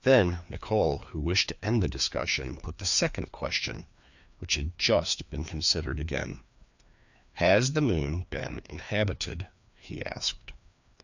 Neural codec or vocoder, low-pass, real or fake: codec, 16 kHz, 2 kbps, FreqCodec, larger model; 7.2 kHz; fake